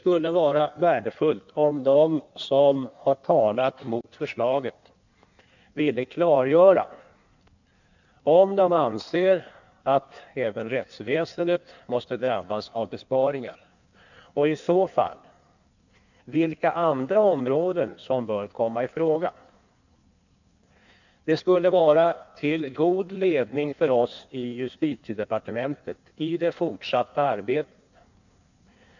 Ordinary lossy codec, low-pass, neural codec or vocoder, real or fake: none; 7.2 kHz; codec, 16 kHz in and 24 kHz out, 1.1 kbps, FireRedTTS-2 codec; fake